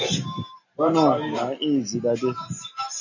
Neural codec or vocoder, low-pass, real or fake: none; 7.2 kHz; real